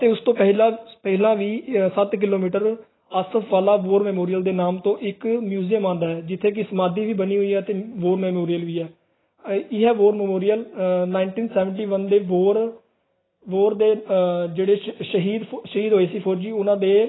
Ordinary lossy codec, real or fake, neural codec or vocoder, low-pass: AAC, 16 kbps; real; none; 7.2 kHz